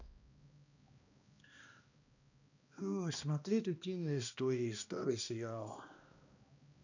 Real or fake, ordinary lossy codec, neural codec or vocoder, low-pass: fake; none; codec, 16 kHz, 1 kbps, X-Codec, HuBERT features, trained on balanced general audio; 7.2 kHz